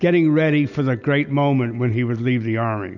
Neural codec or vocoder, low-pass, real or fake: none; 7.2 kHz; real